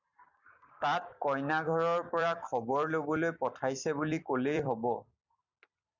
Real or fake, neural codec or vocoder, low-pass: fake; vocoder, 44.1 kHz, 128 mel bands every 256 samples, BigVGAN v2; 7.2 kHz